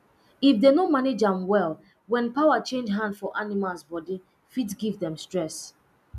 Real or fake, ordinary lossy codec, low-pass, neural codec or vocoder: real; none; 14.4 kHz; none